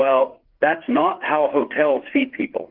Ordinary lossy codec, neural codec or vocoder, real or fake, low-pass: Opus, 16 kbps; codec, 16 kHz, 4 kbps, FreqCodec, larger model; fake; 5.4 kHz